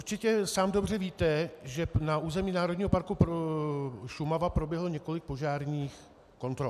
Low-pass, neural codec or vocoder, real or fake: 14.4 kHz; none; real